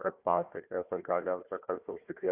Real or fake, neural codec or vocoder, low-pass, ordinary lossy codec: fake; codec, 16 kHz, 1 kbps, FunCodec, trained on Chinese and English, 50 frames a second; 3.6 kHz; AAC, 32 kbps